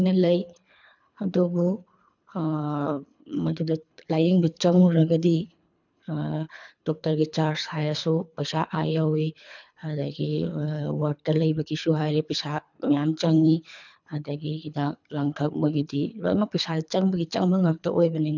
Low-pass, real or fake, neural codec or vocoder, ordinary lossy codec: 7.2 kHz; fake; codec, 24 kHz, 3 kbps, HILCodec; none